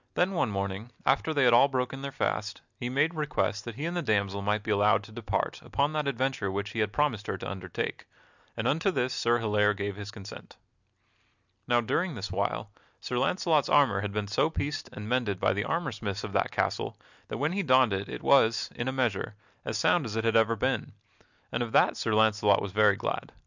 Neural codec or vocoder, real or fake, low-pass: none; real; 7.2 kHz